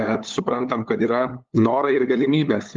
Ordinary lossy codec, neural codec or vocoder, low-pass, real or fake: Opus, 32 kbps; codec, 16 kHz, 8 kbps, FunCodec, trained on LibriTTS, 25 frames a second; 7.2 kHz; fake